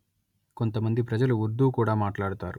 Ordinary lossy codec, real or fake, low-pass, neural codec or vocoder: none; real; 19.8 kHz; none